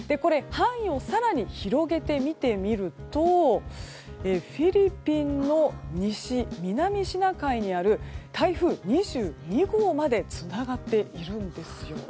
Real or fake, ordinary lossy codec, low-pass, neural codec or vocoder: real; none; none; none